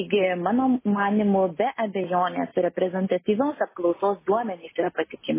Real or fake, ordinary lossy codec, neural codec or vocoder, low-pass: real; MP3, 16 kbps; none; 3.6 kHz